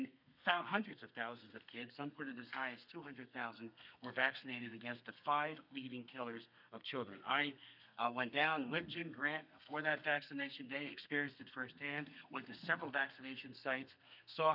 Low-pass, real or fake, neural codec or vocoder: 5.4 kHz; fake; codec, 32 kHz, 1.9 kbps, SNAC